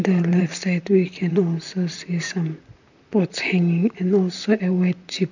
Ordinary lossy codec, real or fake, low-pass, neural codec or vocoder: none; fake; 7.2 kHz; vocoder, 44.1 kHz, 128 mel bands, Pupu-Vocoder